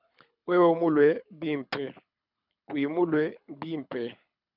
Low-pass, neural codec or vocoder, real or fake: 5.4 kHz; codec, 24 kHz, 6 kbps, HILCodec; fake